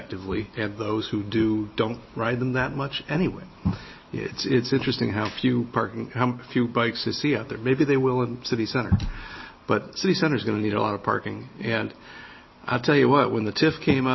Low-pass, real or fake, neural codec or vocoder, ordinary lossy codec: 7.2 kHz; real; none; MP3, 24 kbps